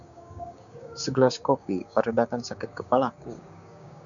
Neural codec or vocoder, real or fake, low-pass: codec, 16 kHz, 6 kbps, DAC; fake; 7.2 kHz